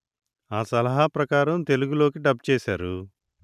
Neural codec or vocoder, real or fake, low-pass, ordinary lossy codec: none; real; 14.4 kHz; none